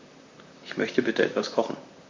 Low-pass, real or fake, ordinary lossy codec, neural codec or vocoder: 7.2 kHz; fake; MP3, 48 kbps; vocoder, 44.1 kHz, 128 mel bands, Pupu-Vocoder